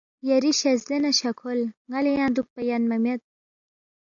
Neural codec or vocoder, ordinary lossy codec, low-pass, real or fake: none; AAC, 64 kbps; 7.2 kHz; real